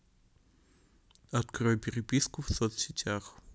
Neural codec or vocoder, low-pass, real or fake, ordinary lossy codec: none; none; real; none